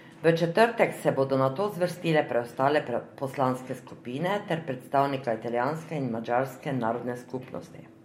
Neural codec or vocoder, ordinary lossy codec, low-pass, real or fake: none; MP3, 64 kbps; 19.8 kHz; real